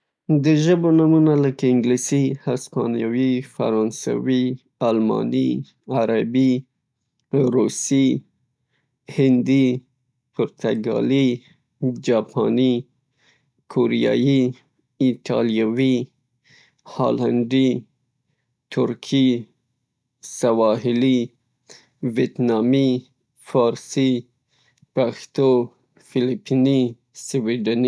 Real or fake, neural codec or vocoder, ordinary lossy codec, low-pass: real; none; none; none